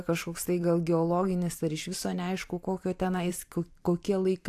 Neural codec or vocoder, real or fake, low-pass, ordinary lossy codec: vocoder, 44.1 kHz, 128 mel bands every 512 samples, BigVGAN v2; fake; 14.4 kHz; AAC, 64 kbps